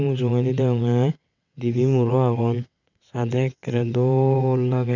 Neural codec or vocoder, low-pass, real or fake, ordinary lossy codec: vocoder, 22.05 kHz, 80 mel bands, WaveNeXt; 7.2 kHz; fake; none